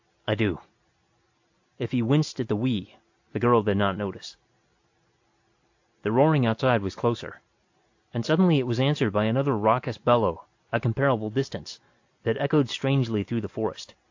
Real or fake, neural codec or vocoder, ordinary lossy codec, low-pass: real; none; AAC, 48 kbps; 7.2 kHz